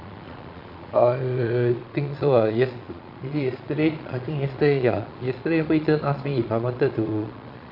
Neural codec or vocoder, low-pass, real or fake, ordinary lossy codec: vocoder, 22.05 kHz, 80 mel bands, Vocos; 5.4 kHz; fake; AAC, 48 kbps